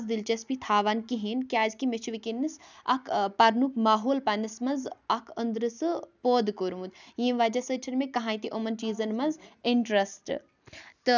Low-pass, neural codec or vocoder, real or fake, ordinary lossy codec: 7.2 kHz; none; real; none